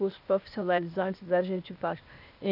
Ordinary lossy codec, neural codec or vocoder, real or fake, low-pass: none; codec, 16 kHz, 0.8 kbps, ZipCodec; fake; 5.4 kHz